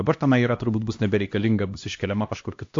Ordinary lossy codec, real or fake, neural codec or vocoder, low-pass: AAC, 48 kbps; fake; codec, 16 kHz, 1 kbps, X-Codec, HuBERT features, trained on LibriSpeech; 7.2 kHz